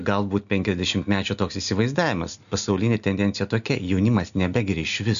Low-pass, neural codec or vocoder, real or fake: 7.2 kHz; none; real